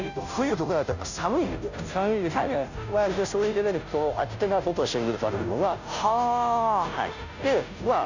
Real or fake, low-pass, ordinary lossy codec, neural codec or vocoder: fake; 7.2 kHz; none; codec, 16 kHz, 0.5 kbps, FunCodec, trained on Chinese and English, 25 frames a second